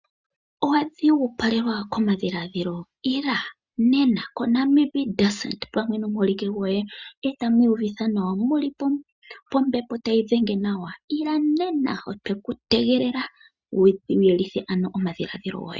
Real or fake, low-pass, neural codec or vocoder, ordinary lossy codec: real; 7.2 kHz; none; Opus, 64 kbps